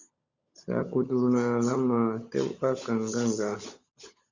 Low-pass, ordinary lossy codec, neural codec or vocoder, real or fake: 7.2 kHz; Opus, 64 kbps; codec, 16 kHz, 16 kbps, FunCodec, trained on LibriTTS, 50 frames a second; fake